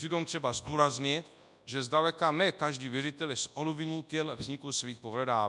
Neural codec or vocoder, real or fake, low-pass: codec, 24 kHz, 0.9 kbps, WavTokenizer, large speech release; fake; 10.8 kHz